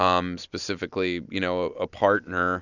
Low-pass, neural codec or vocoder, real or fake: 7.2 kHz; none; real